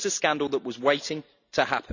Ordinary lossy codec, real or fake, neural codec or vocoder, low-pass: none; real; none; 7.2 kHz